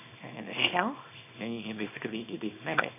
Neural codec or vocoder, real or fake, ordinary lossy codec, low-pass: codec, 24 kHz, 0.9 kbps, WavTokenizer, small release; fake; none; 3.6 kHz